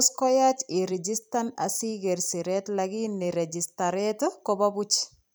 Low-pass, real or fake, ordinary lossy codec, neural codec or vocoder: none; fake; none; vocoder, 44.1 kHz, 128 mel bands every 256 samples, BigVGAN v2